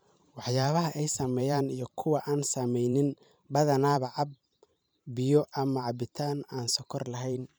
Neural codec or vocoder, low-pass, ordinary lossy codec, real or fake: vocoder, 44.1 kHz, 128 mel bands every 512 samples, BigVGAN v2; none; none; fake